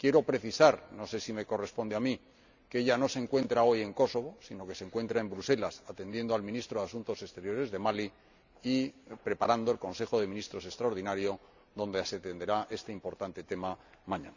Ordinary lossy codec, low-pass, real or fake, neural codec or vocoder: none; 7.2 kHz; real; none